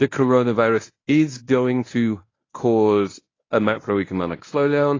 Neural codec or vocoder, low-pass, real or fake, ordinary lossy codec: codec, 24 kHz, 0.9 kbps, WavTokenizer, medium speech release version 1; 7.2 kHz; fake; AAC, 32 kbps